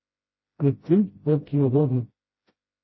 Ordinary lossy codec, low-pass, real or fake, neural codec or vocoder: MP3, 24 kbps; 7.2 kHz; fake; codec, 16 kHz, 0.5 kbps, FreqCodec, smaller model